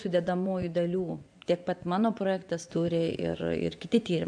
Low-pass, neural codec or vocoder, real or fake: 9.9 kHz; vocoder, 24 kHz, 100 mel bands, Vocos; fake